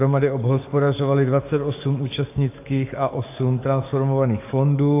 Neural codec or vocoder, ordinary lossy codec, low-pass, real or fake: codec, 44.1 kHz, 7.8 kbps, DAC; AAC, 24 kbps; 3.6 kHz; fake